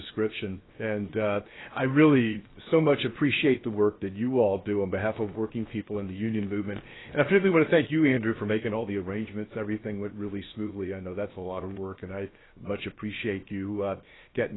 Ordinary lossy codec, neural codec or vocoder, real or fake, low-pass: AAC, 16 kbps; codec, 16 kHz, 0.7 kbps, FocalCodec; fake; 7.2 kHz